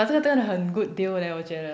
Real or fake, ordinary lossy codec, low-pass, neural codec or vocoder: real; none; none; none